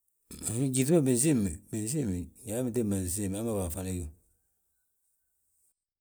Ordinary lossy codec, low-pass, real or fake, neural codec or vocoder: none; none; real; none